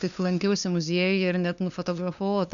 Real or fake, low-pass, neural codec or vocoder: fake; 7.2 kHz; codec, 16 kHz, 2 kbps, FunCodec, trained on LibriTTS, 25 frames a second